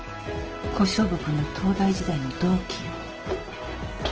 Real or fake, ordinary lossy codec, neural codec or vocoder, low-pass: real; Opus, 16 kbps; none; 7.2 kHz